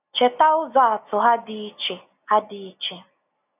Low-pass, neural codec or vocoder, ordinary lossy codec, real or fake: 3.6 kHz; none; none; real